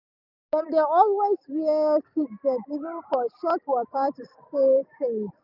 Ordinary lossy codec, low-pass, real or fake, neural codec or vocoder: none; 5.4 kHz; real; none